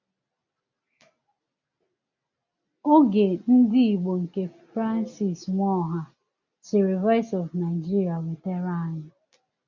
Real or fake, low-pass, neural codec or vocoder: real; 7.2 kHz; none